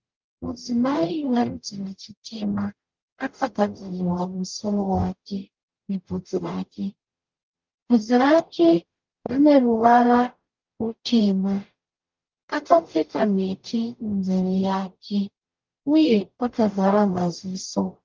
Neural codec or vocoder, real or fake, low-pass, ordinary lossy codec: codec, 44.1 kHz, 0.9 kbps, DAC; fake; 7.2 kHz; Opus, 24 kbps